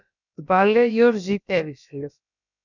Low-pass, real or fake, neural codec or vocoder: 7.2 kHz; fake; codec, 16 kHz, about 1 kbps, DyCAST, with the encoder's durations